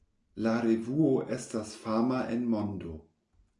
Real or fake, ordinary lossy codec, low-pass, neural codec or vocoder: real; AAC, 48 kbps; 10.8 kHz; none